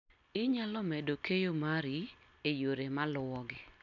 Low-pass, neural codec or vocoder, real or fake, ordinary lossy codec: 7.2 kHz; none; real; none